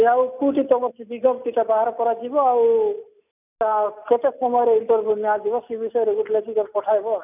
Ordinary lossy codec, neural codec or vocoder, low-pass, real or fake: none; none; 3.6 kHz; real